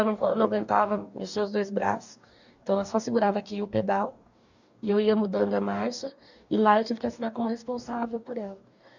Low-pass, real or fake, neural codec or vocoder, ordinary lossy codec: 7.2 kHz; fake; codec, 44.1 kHz, 2.6 kbps, DAC; none